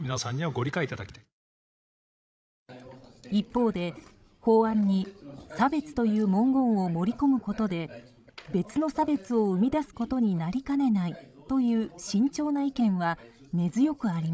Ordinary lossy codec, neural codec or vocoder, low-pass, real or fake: none; codec, 16 kHz, 16 kbps, FreqCodec, larger model; none; fake